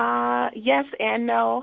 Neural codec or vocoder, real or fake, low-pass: autoencoder, 48 kHz, 128 numbers a frame, DAC-VAE, trained on Japanese speech; fake; 7.2 kHz